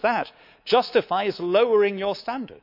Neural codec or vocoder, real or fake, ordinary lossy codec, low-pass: codec, 24 kHz, 3.1 kbps, DualCodec; fake; none; 5.4 kHz